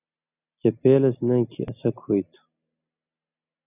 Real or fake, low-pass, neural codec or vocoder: real; 3.6 kHz; none